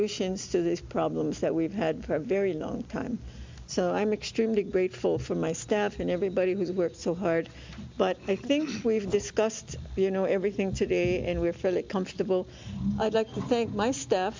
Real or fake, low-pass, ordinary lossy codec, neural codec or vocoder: real; 7.2 kHz; MP3, 64 kbps; none